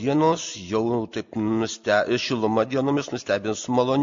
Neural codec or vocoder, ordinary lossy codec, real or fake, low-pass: none; MP3, 48 kbps; real; 7.2 kHz